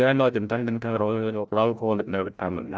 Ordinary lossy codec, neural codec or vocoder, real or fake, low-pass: none; codec, 16 kHz, 0.5 kbps, FreqCodec, larger model; fake; none